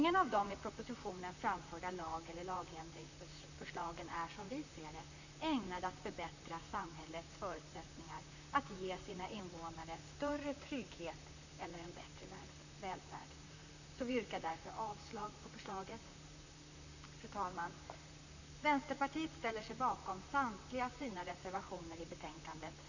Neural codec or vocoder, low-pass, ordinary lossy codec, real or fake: vocoder, 44.1 kHz, 128 mel bands, Pupu-Vocoder; 7.2 kHz; none; fake